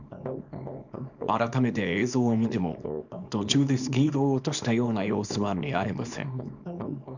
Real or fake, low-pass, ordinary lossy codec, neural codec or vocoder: fake; 7.2 kHz; none; codec, 24 kHz, 0.9 kbps, WavTokenizer, small release